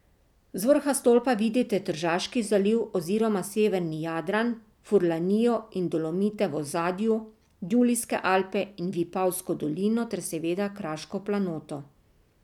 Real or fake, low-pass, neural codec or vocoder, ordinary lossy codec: real; 19.8 kHz; none; none